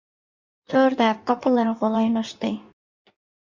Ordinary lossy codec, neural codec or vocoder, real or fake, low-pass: Opus, 64 kbps; codec, 16 kHz in and 24 kHz out, 1.1 kbps, FireRedTTS-2 codec; fake; 7.2 kHz